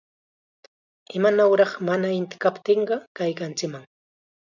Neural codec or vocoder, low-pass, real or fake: none; 7.2 kHz; real